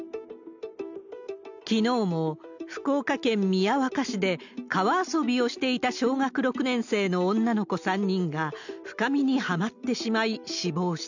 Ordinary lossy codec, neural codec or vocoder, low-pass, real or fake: none; none; 7.2 kHz; real